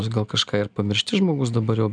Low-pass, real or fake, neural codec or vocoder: 9.9 kHz; real; none